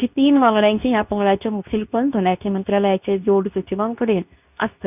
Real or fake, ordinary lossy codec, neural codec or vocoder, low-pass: fake; none; codec, 24 kHz, 0.9 kbps, WavTokenizer, medium speech release version 2; 3.6 kHz